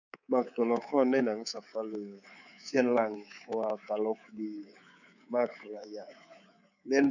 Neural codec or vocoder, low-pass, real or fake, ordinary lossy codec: codec, 24 kHz, 3.1 kbps, DualCodec; 7.2 kHz; fake; none